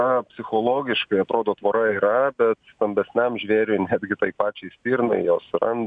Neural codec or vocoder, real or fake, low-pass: none; real; 9.9 kHz